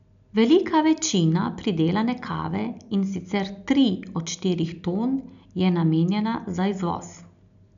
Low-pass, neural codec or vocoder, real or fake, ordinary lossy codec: 7.2 kHz; none; real; none